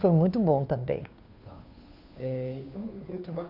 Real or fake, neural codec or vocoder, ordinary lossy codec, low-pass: fake; codec, 16 kHz, 2 kbps, FunCodec, trained on Chinese and English, 25 frames a second; none; 5.4 kHz